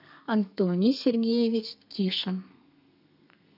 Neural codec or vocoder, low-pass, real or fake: codec, 32 kHz, 1.9 kbps, SNAC; 5.4 kHz; fake